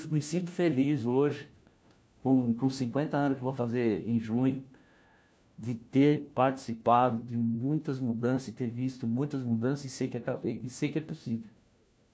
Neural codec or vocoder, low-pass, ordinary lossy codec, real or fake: codec, 16 kHz, 1 kbps, FunCodec, trained on LibriTTS, 50 frames a second; none; none; fake